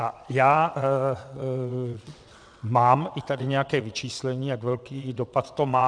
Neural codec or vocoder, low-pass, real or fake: vocoder, 22.05 kHz, 80 mel bands, WaveNeXt; 9.9 kHz; fake